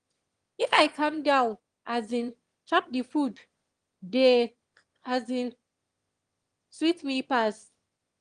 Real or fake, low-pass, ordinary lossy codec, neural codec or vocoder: fake; 9.9 kHz; Opus, 24 kbps; autoencoder, 22.05 kHz, a latent of 192 numbers a frame, VITS, trained on one speaker